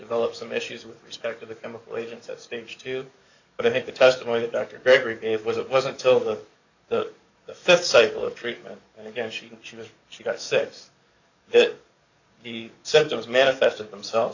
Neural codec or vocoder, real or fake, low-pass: codec, 44.1 kHz, 7.8 kbps, DAC; fake; 7.2 kHz